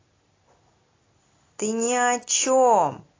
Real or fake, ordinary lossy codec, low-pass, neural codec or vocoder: real; AAC, 32 kbps; 7.2 kHz; none